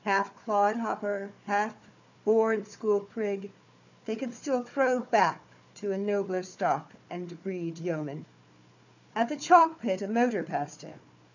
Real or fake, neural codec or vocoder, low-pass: fake; codec, 16 kHz, 4 kbps, FunCodec, trained on Chinese and English, 50 frames a second; 7.2 kHz